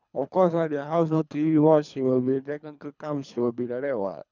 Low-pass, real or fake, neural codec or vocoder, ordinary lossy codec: 7.2 kHz; fake; codec, 24 kHz, 3 kbps, HILCodec; none